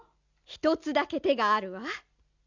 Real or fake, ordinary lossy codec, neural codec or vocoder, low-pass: real; none; none; 7.2 kHz